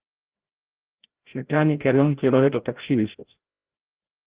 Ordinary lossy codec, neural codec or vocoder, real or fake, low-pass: Opus, 16 kbps; codec, 16 kHz, 0.5 kbps, FreqCodec, larger model; fake; 3.6 kHz